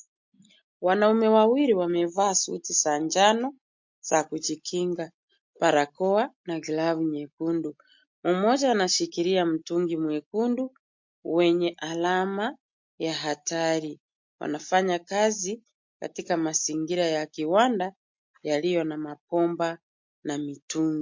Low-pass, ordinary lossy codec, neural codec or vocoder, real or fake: 7.2 kHz; MP3, 48 kbps; none; real